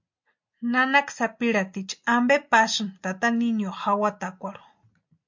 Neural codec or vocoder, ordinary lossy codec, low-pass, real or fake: none; MP3, 64 kbps; 7.2 kHz; real